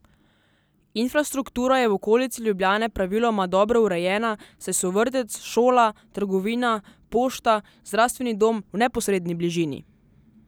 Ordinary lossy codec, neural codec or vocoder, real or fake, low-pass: none; none; real; none